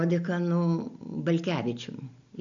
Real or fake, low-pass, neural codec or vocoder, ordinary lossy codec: real; 7.2 kHz; none; AAC, 64 kbps